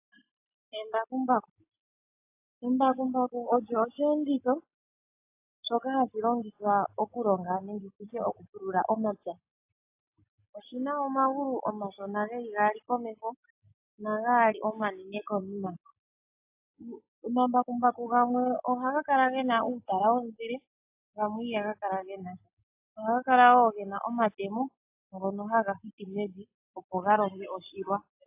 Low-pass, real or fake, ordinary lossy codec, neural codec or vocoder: 3.6 kHz; real; AAC, 32 kbps; none